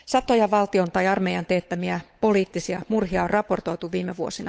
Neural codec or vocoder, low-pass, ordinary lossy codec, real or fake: codec, 16 kHz, 8 kbps, FunCodec, trained on Chinese and English, 25 frames a second; none; none; fake